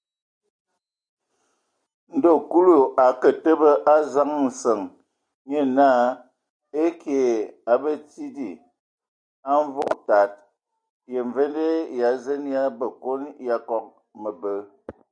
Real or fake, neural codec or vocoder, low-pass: real; none; 9.9 kHz